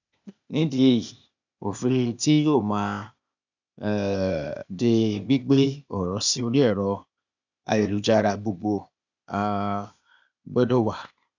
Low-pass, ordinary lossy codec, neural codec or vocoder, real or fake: 7.2 kHz; none; codec, 16 kHz, 0.8 kbps, ZipCodec; fake